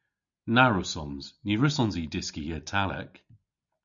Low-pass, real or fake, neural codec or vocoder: 7.2 kHz; real; none